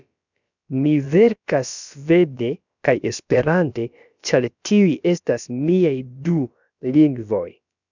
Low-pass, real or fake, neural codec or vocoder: 7.2 kHz; fake; codec, 16 kHz, about 1 kbps, DyCAST, with the encoder's durations